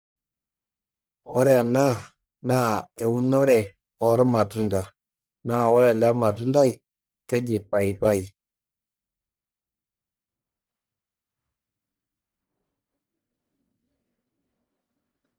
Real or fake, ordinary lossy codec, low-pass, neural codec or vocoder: fake; none; none; codec, 44.1 kHz, 1.7 kbps, Pupu-Codec